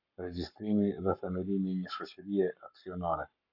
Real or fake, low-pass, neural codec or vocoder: fake; 5.4 kHz; codec, 44.1 kHz, 7.8 kbps, Pupu-Codec